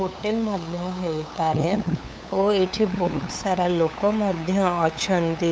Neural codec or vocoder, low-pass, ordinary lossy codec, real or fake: codec, 16 kHz, 8 kbps, FunCodec, trained on LibriTTS, 25 frames a second; none; none; fake